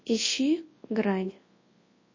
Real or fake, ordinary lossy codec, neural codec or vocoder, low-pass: fake; MP3, 32 kbps; codec, 24 kHz, 0.9 kbps, WavTokenizer, large speech release; 7.2 kHz